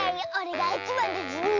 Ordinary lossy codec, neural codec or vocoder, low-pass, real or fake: MP3, 64 kbps; none; 7.2 kHz; real